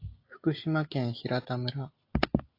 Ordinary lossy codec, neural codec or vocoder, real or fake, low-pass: AAC, 32 kbps; none; real; 5.4 kHz